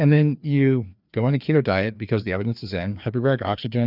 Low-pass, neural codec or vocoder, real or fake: 5.4 kHz; codec, 16 kHz, 2 kbps, FreqCodec, larger model; fake